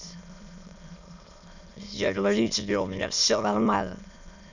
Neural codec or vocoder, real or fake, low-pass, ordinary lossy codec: autoencoder, 22.05 kHz, a latent of 192 numbers a frame, VITS, trained on many speakers; fake; 7.2 kHz; none